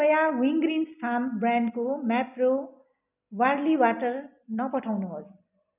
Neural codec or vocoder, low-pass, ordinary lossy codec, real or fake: none; 3.6 kHz; none; real